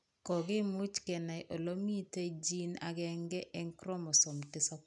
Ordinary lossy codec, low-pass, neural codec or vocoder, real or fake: none; 10.8 kHz; none; real